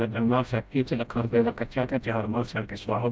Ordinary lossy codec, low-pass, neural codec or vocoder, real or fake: none; none; codec, 16 kHz, 0.5 kbps, FreqCodec, smaller model; fake